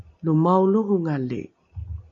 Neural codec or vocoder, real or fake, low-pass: none; real; 7.2 kHz